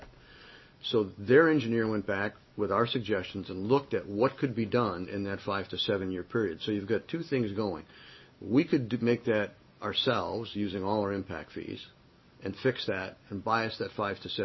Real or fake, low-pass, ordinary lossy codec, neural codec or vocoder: real; 7.2 kHz; MP3, 24 kbps; none